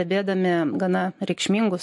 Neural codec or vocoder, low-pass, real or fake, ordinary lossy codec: none; 10.8 kHz; real; MP3, 48 kbps